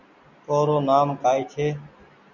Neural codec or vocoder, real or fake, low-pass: none; real; 7.2 kHz